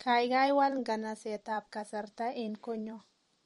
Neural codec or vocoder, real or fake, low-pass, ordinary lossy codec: vocoder, 44.1 kHz, 128 mel bands every 256 samples, BigVGAN v2; fake; 19.8 kHz; MP3, 48 kbps